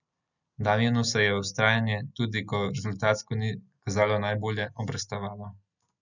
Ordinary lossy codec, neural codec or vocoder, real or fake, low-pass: none; none; real; 7.2 kHz